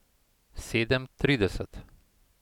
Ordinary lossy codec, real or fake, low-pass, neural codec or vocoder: none; real; 19.8 kHz; none